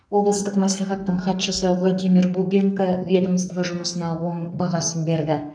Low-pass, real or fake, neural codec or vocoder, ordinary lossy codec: 9.9 kHz; fake; codec, 44.1 kHz, 2.6 kbps, SNAC; none